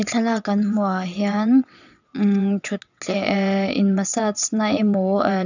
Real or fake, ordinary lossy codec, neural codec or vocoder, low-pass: fake; none; vocoder, 22.05 kHz, 80 mel bands, WaveNeXt; 7.2 kHz